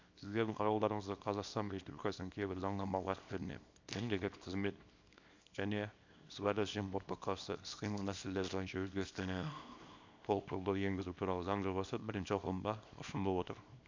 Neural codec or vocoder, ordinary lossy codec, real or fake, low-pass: codec, 24 kHz, 0.9 kbps, WavTokenizer, small release; MP3, 64 kbps; fake; 7.2 kHz